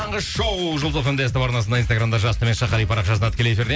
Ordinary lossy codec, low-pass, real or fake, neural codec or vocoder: none; none; real; none